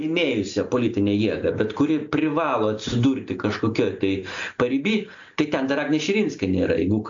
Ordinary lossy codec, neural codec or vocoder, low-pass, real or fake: AAC, 64 kbps; none; 7.2 kHz; real